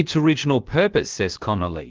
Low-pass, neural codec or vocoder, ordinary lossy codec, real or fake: 7.2 kHz; codec, 16 kHz, about 1 kbps, DyCAST, with the encoder's durations; Opus, 16 kbps; fake